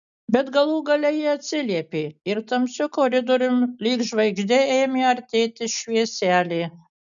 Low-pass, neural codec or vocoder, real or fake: 7.2 kHz; none; real